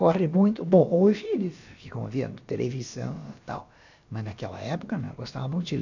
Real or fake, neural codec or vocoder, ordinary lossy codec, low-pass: fake; codec, 16 kHz, about 1 kbps, DyCAST, with the encoder's durations; none; 7.2 kHz